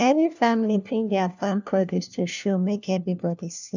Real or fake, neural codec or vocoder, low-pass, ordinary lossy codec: fake; codec, 16 kHz, 1 kbps, FunCodec, trained on LibriTTS, 50 frames a second; 7.2 kHz; none